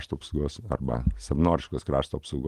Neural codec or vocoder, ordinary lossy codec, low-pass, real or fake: none; Opus, 24 kbps; 14.4 kHz; real